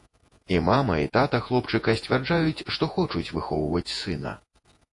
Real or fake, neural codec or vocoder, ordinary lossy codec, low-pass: fake; vocoder, 48 kHz, 128 mel bands, Vocos; AAC, 48 kbps; 10.8 kHz